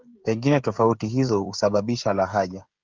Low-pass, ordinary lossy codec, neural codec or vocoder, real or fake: 7.2 kHz; Opus, 32 kbps; codec, 16 kHz, 16 kbps, FreqCodec, smaller model; fake